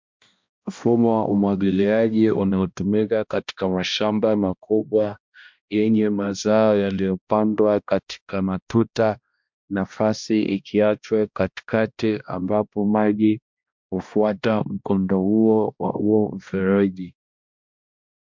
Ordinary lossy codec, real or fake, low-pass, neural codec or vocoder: MP3, 64 kbps; fake; 7.2 kHz; codec, 16 kHz, 1 kbps, X-Codec, HuBERT features, trained on balanced general audio